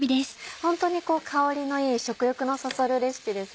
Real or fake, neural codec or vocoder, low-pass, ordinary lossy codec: real; none; none; none